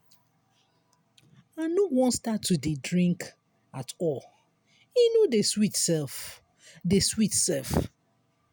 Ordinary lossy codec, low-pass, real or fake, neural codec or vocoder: none; none; real; none